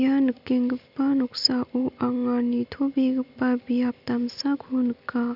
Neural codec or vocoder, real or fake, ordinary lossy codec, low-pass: none; real; none; 5.4 kHz